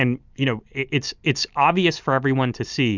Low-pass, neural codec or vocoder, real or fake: 7.2 kHz; none; real